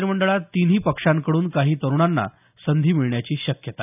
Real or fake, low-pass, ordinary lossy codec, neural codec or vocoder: real; 3.6 kHz; none; none